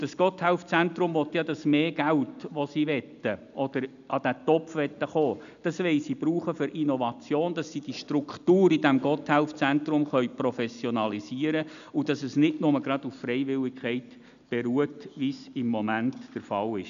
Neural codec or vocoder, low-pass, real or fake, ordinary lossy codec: none; 7.2 kHz; real; none